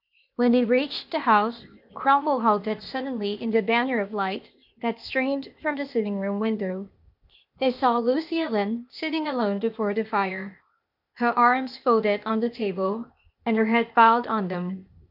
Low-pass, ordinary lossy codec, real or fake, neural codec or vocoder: 5.4 kHz; MP3, 48 kbps; fake; codec, 16 kHz, 0.8 kbps, ZipCodec